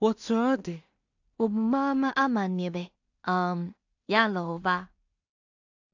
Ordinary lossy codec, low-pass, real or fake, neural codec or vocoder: AAC, 48 kbps; 7.2 kHz; fake; codec, 16 kHz in and 24 kHz out, 0.4 kbps, LongCat-Audio-Codec, two codebook decoder